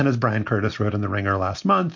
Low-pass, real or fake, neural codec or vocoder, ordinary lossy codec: 7.2 kHz; real; none; MP3, 48 kbps